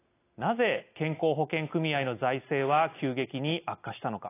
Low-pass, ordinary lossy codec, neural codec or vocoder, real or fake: 3.6 kHz; AAC, 24 kbps; none; real